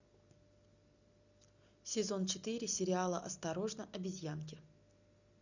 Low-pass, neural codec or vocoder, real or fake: 7.2 kHz; none; real